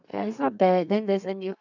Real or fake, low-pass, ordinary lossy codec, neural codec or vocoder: fake; 7.2 kHz; none; codec, 32 kHz, 1.9 kbps, SNAC